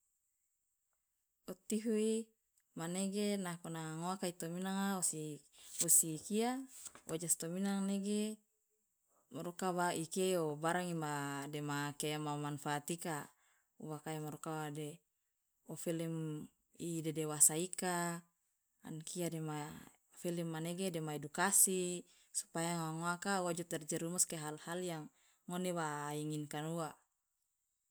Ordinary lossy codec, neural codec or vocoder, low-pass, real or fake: none; none; none; real